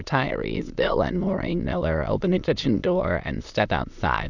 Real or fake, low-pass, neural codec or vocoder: fake; 7.2 kHz; autoencoder, 22.05 kHz, a latent of 192 numbers a frame, VITS, trained on many speakers